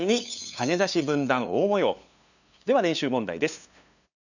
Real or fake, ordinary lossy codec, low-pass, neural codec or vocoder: fake; none; 7.2 kHz; codec, 16 kHz, 2 kbps, FunCodec, trained on LibriTTS, 25 frames a second